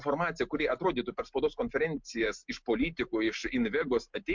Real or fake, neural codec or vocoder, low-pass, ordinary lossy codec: real; none; 7.2 kHz; MP3, 64 kbps